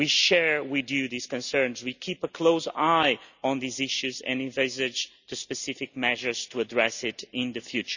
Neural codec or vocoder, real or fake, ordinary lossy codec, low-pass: none; real; none; 7.2 kHz